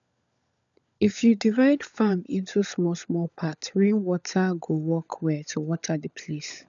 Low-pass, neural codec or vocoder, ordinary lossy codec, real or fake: 7.2 kHz; codec, 16 kHz, 16 kbps, FunCodec, trained on LibriTTS, 50 frames a second; none; fake